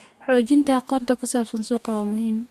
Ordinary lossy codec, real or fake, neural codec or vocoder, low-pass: MP3, 96 kbps; fake; codec, 44.1 kHz, 2.6 kbps, DAC; 14.4 kHz